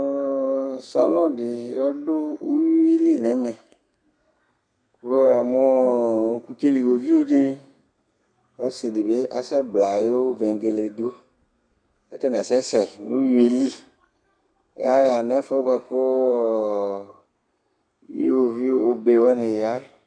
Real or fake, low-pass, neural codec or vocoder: fake; 9.9 kHz; codec, 32 kHz, 1.9 kbps, SNAC